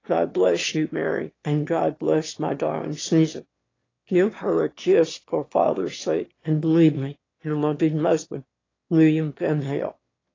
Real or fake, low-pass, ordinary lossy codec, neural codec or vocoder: fake; 7.2 kHz; AAC, 32 kbps; autoencoder, 22.05 kHz, a latent of 192 numbers a frame, VITS, trained on one speaker